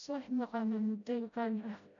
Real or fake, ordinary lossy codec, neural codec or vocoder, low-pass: fake; none; codec, 16 kHz, 0.5 kbps, FreqCodec, smaller model; 7.2 kHz